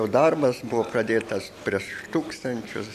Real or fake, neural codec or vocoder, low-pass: real; none; 14.4 kHz